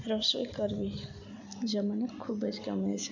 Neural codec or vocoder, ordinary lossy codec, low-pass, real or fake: none; none; 7.2 kHz; real